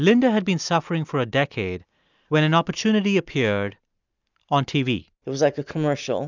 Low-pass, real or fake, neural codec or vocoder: 7.2 kHz; real; none